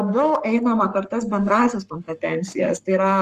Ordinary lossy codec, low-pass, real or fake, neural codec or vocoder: Opus, 24 kbps; 14.4 kHz; fake; codec, 44.1 kHz, 7.8 kbps, Pupu-Codec